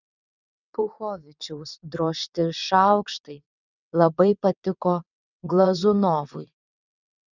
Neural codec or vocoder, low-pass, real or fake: vocoder, 22.05 kHz, 80 mel bands, WaveNeXt; 7.2 kHz; fake